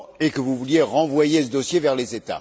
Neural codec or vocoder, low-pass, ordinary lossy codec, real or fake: none; none; none; real